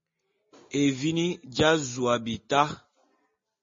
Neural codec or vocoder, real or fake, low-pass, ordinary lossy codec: none; real; 7.2 kHz; MP3, 32 kbps